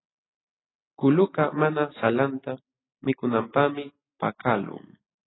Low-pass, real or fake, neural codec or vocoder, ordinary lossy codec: 7.2 kHz; real; none; AAC, 16 kbps